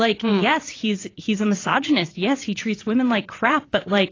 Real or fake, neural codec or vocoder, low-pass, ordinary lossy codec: fake; vocoder, 22.05 kHz, 80 mel bands, WaveNeXt; 7.2 kHz; AAC, 32 kbps